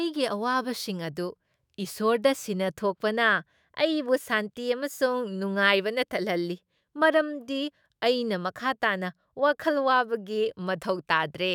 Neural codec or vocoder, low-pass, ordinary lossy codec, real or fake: autoencoder, 48 kHz, 128 numbers a frame, DAC-VAE, trained on Japanese speech; none; none; fake